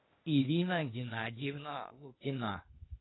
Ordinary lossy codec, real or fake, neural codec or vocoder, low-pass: AAC, 16 kbps; fake; codec, 16 kHz, 0.8 kbps, ZipCodec; 7.2 kHz